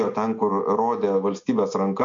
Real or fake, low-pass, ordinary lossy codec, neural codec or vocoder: real; 7.2 kHz; MP3, 48 kbps; none